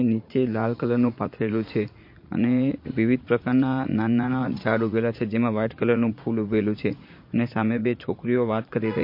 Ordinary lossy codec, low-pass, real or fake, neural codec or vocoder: MP3, 32 kbps; 5.4 kHz; fake; vocoder, 44.1 kHz, 80 mel bands, Vocos